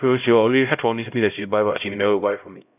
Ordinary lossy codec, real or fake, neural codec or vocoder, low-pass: none; fake; codec, 16 kHz, 0.5 kbps, X-Codec, HuBERT features, trained on LibriSpeech; 3.6 kHz